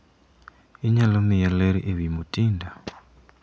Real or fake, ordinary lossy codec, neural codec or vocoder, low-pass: real; none; none; none